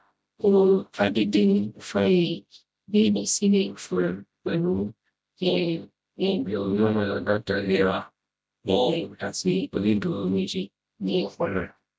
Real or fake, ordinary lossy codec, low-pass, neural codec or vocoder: fake; none; none; codec, 16 kHz, 0.5 kbps, FreqCodec, smaller model